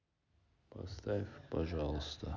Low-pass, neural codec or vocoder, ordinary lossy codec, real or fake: 7.2 kHz; none; none; real